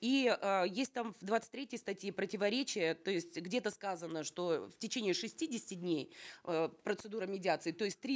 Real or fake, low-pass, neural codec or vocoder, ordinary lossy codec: real; none; none; none